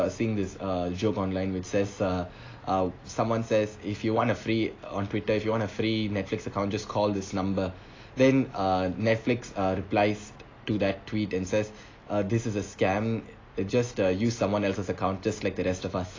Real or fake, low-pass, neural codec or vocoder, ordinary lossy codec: real; 7.2 kHz; none; AAC, 32 kbps